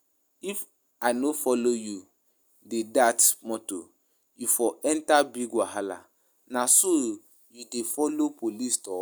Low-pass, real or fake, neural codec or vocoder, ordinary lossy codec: none; real; none; none